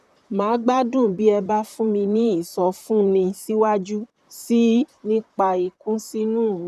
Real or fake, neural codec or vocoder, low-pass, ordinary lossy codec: fake; vocoder, 44.1 kHz, 128 mel bands, Pupu-Vocoder; 14.4 kHz; none